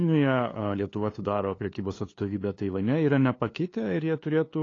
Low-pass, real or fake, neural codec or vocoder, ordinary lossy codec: 7.2 kHz; fake; codec, 16 kHz, 2 kbps, FunCodec, trained on LibriTTS, 25 frames a second; AAC, 32 kbps